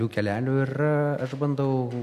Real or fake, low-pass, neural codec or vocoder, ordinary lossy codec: real; 14.4 kHz; none; AAC, 96 kbps